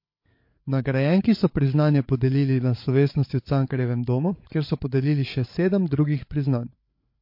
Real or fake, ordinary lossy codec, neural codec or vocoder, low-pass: fake; MP3, 32 kbps; codec, 16 kHz, 8 kbps, FreqCodec, larger model; 5.4 kHz